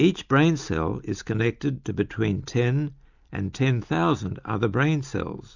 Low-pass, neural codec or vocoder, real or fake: 7.2 kHz; none; real